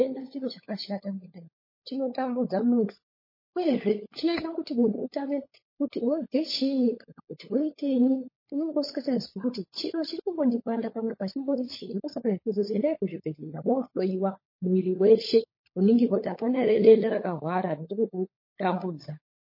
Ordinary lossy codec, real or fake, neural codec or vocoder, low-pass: MP3, 24 kbps; fake; codec, 16 kHz, 16 kbps, FunCodec, trained on LibriTTS, 50 frames a second; 5.4 kHz